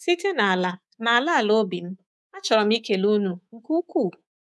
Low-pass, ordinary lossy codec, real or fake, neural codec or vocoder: none; none; fake; codec, 24 kHz, 3.1 kbps, DualCodec